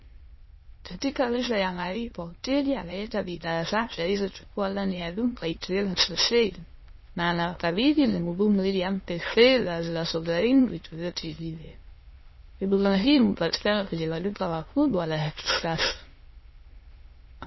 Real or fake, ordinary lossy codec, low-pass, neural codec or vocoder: fake; MP3, 24 kbps; 7.2 kHz; autoencoder, 22.05 kHz, a latent of 192 numbers a frame, VITS, trained on many speakers